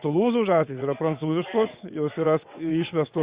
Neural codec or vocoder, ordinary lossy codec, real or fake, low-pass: vocoder, 22.05 kHz, 80 mel bands, WaveNeXt; Opus, 64 kbps; fake; 3.6 kHz